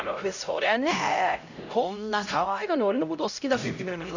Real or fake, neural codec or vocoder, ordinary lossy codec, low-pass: fake; codec, 16 kHz, 0.5 kbps, X-Codec, HuBERT features, trained on LibriSpeech; none; 7.2 kHz